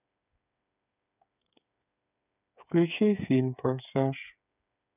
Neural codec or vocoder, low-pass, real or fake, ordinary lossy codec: codec, 16 kHz, 8 kbps, FreqCodec, smaller model; 3.6 kHz; fake; none